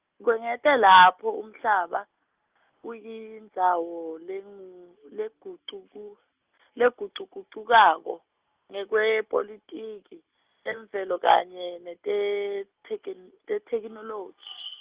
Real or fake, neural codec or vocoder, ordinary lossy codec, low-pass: real; none; Opus, 32 kbps; 3.6 kHz